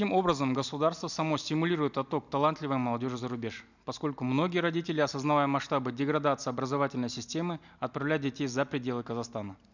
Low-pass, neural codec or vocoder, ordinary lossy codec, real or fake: 7.2 kHz; none; none; real